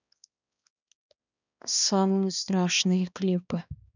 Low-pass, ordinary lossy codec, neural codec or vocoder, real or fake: 7.2 kHz; none; codec, 16 kHz, 2 kbps, X-Codec, HuBERT features, trained on balanced general audio; fake